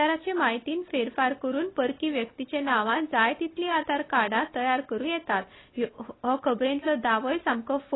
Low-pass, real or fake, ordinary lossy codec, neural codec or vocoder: 7.2 kHz; real; AAC, 16 kbps; none